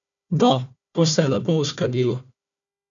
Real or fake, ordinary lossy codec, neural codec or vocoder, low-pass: fake; none; codec, 16 kHz, 1 kbps, FunCodec, trained on Chinese and English, 50 frames a second; 7.2 kHz